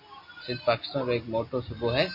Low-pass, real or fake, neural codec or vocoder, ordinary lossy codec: 5.4 kHz; real; none; MP3, 32 kbps